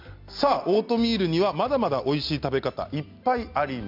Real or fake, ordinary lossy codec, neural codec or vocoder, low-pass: real; MP3, 48 kbps; none; 5.4 kHz